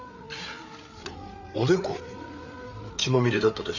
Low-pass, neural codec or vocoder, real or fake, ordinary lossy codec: 7.2 kHz; codec, 16 kHz, 8 kbps, FreqCodec, larger model; fake; none